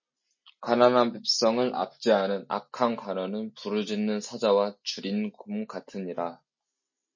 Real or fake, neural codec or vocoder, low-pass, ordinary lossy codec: real; none; 7.2 kHz; MP3, 32 kbps